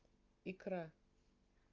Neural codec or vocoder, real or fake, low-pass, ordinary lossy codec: none; real; 7.2 kHz; Opus, 24 kbps